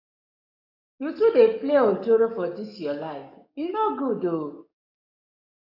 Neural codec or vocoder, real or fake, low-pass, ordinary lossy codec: codec, 44.1 kHz, 7.8 kbps, DAC; fake; 5.4 kHz; AAC, 48 kbps